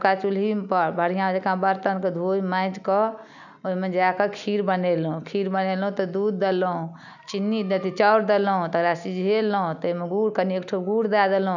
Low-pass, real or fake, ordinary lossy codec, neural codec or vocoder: 7.2 kHz; real; none; none